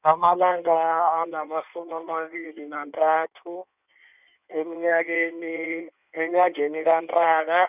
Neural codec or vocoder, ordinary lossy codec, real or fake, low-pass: codec, 16 kHz in and 24 kHz out, 1.1 kbps, FireRedTTS-2 codec; none; fake; 3.6 kHz